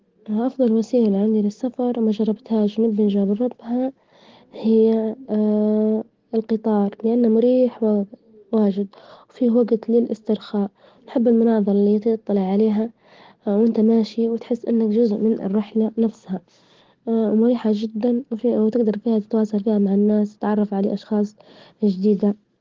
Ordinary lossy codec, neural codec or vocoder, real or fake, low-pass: Opus, 16 kbps; none; real; 7.2 kHz